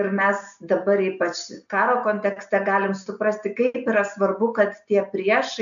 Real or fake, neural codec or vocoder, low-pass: real; none; 7.2 kHz